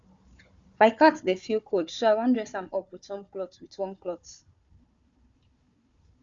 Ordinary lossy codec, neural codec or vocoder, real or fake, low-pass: Opus, 64 kbps; codec, 16 kHz, 4 kbps, FunCodec, trained on Chinese and English, 50 frames a second; fake; 7.2 kHz